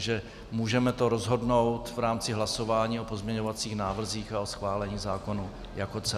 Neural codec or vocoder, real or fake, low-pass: none; real; 14.4 kHz